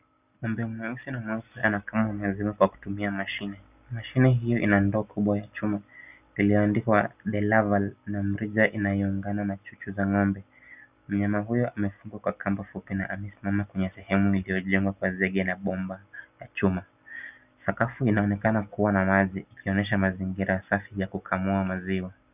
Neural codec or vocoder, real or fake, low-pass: none; real; 3.6 kHz